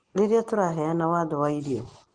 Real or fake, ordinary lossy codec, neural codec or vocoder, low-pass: real; Opus, 16 kbps; none; 9.9 kHz